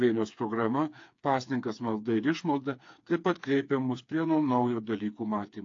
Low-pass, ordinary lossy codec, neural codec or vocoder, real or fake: 7.2 kHz; AAC, 48 kbps; codec, 16 kHz, 4 kbps, FreqCodec, smaller model; fake